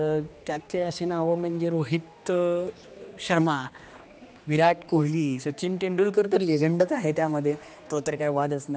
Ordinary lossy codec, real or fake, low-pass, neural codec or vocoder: none; fake; none; codec, 16 kHz, 2 kbps, X-Codec, HuBERT features, trained on general audio